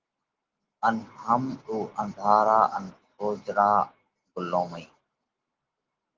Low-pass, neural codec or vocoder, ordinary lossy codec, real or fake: 7.2 kHz; none; Opus, 16 kbps; real